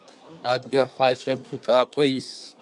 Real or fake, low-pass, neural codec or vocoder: fake; 10.8 kHz; codec, 24 kHz, 1 kbps, SNAC